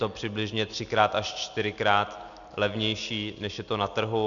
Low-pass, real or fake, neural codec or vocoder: 7.2 kHz; real; none